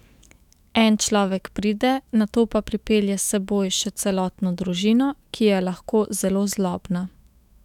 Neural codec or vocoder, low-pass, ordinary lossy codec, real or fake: autoencoder, 48 kHz, 128 numbers a frame, DAC-VAE, trained on Japanese speech; 19.8 kHz; none; fake